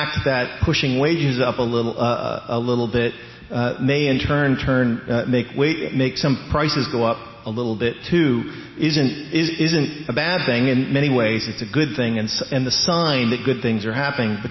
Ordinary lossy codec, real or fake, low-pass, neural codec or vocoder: MP3, 24 kbps; real; 7.2 kHz; none